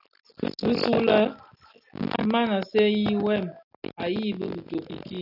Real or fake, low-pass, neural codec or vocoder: real; 5.4 kHz; none